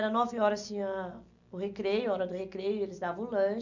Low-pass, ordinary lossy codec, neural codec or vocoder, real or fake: 7.2 kHz; none; none; real